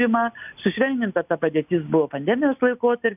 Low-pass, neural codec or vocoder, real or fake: 3.6 kHz; none; real